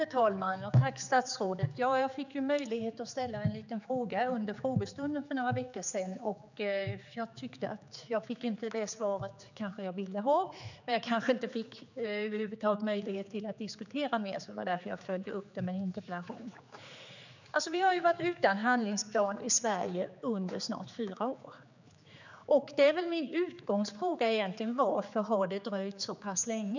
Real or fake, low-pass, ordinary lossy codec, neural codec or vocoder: fake; 7.2 kHz; none; codec, 16 kHz, 4 kbps, X-Codec, HuBERT features, trained on general audio